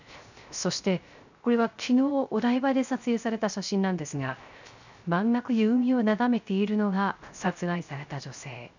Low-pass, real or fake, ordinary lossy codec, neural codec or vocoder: 7.2 kHz; fake; none; codec, 16 kHz, 0.3 kbps, FocalCodec